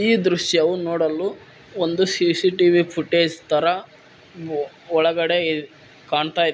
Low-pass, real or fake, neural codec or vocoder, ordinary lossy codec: none; real; none; none